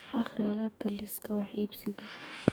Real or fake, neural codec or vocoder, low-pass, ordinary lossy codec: fake; codec, 44.1 kHz, 2.6 kbps, DAC; none; none